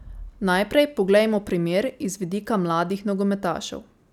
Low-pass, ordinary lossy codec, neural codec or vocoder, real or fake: 19.8 kHz; none; none; real